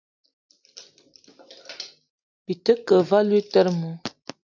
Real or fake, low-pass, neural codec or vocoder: real; 7.2 kHz; none